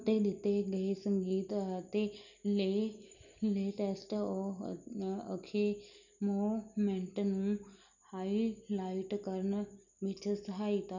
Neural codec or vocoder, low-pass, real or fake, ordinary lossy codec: none; 7.2 kHz; real; none